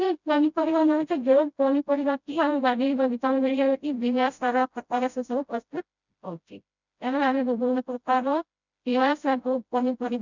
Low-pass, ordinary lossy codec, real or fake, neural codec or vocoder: 7.2 kHz; none; fake; codec, 16 kHz, 0.5 kbps, FreqCodec, smaller model